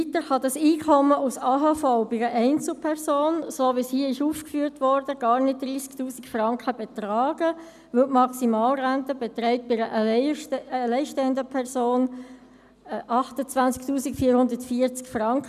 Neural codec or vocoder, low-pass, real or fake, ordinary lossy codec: none; 14.4 kHz; real; none